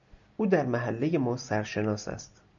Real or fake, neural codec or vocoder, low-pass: real; none; 7.2 kHz